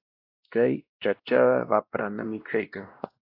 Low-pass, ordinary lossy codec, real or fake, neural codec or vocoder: 5.4 kHz; AAC, 32 kbps; fake; codec, 16 kHz, 1 kbps, X-Codec, WavLM features, trained on Multilingual LibriSpeech